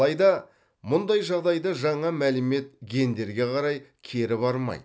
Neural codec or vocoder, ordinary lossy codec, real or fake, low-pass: none; none; real; none